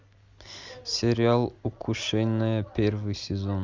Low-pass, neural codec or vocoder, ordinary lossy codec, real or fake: 7.2 kHz; none; Opus, 32 kbps; real